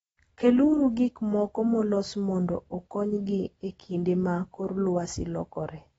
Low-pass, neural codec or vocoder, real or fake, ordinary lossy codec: 19.8 kHz; none; real; AAC, 24 kbps